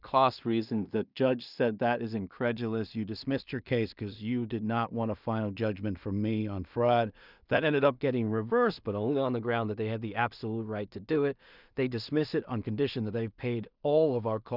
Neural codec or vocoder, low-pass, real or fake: codec, 16 kHz in and 24 kHz out, 0.4 kbps, LongCat-Audio-Codec, two codebook decoder; 5.4 kHz; fake